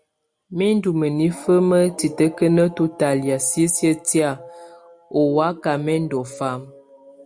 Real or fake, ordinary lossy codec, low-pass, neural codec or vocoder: real; Opus, 64 kbps; 9.9 kHz; none